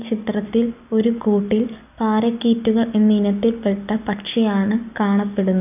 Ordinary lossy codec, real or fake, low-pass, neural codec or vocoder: none; real; 3.6 kHz; none